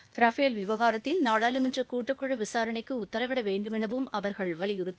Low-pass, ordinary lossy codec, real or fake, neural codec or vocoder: none; none; fake; codec, 16 kHz, 0.8 kbps, ZipCodec